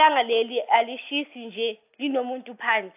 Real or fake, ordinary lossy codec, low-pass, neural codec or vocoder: real; AAC, 32 kbps; 3.6 kHz; none